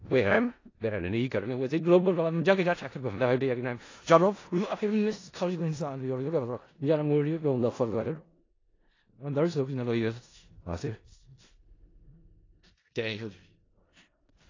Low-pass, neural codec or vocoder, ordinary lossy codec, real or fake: 7.2 kHz; codec, 16 kHz in and 24 kHz out, 0.4 kbps, LongCat-Audio-Codec, four codebook decoder; AAC, 32 kbps; fake